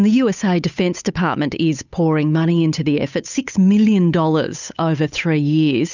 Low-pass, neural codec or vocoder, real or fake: 7.2 kHz; codec, 16 kHz, 8 kbps, FunCodec, trained on Chinese and English, 25 frames a second; fake